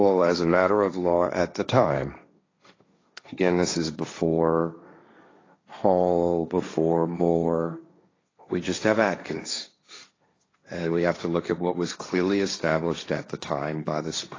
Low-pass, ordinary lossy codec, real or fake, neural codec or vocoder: 7.2 kHz; AAC, 32 kbps; fake; codec, 16 kHz, 1.1 kbps, Voila-Tokenizer